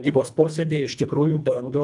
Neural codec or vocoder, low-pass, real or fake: codec, 24 kHz, 1.5 kbps, HILCodec; 10.8 kHz; fake